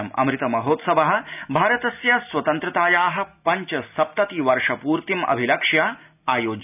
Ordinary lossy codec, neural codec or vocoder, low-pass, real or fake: none; none; 3.6 kHz; real